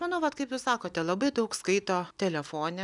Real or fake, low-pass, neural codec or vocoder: real; 10.8 kHz; none